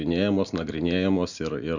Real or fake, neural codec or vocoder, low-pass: real; none; 7.2 kHz